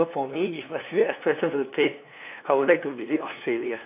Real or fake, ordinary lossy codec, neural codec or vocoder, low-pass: fake; AAC, 32 kbps; codec, 16 kHz, 2 kbps, FunCodec, trained on LibriTTS, 25 frames a second; 3.6 kHz